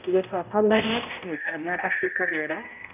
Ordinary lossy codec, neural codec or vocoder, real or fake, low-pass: none; codec, 16 kHz, 0.5 kbps, X-Codec, HuBERT features, trained on balanced general audio; fake; 3.6 kHz